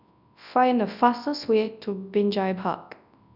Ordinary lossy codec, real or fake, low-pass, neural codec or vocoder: none; fake; 5.4 kHz; codec, 24 kHz, 0.9 kbps, WavTokenizer, large speech release